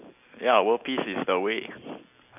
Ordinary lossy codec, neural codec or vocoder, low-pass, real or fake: none; none; 3.6 kHz; real